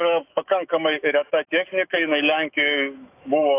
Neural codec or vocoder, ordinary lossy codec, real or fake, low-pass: autoencoder, 48 kHz, 128 numbers a frame, DAC-VAE, trained on Japanese speech; AAC, 24 kbps; fake; 3.6 kHz